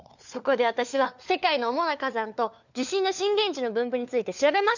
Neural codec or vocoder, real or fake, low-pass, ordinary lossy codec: codec, 16 kHz, 4 kbps, FunCodec, trained on LibriTTS, 50 frames a second; fake; 7.2 kHz; none